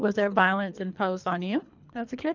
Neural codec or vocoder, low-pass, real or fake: codec, 24 kHz, 3 kbps, HILCodec; 7.2 kHz; fake